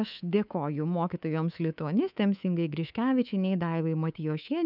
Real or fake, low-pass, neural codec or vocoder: fake; 5.4 kHz; codec, 24 kHz, 3.1 kbps, DualCodec